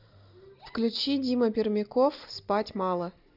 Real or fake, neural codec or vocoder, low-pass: real; none; 5.4 kHz